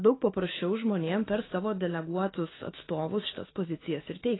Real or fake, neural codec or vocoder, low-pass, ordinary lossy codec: fake; autoencoder, 48 kHz, 128 numbers a frame, DAC-VAE, trained on Japanese speech; 7.2 kHz; AAC, 16 kbps